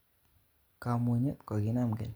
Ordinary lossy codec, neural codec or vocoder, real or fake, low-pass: none; none; real; none